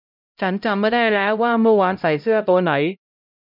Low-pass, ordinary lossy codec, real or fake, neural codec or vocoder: 5.4 kHz; none; fake; codec, 16 kHz, 0.5 kbps, X-Codec, HuBERT features, trained on LibriSpeech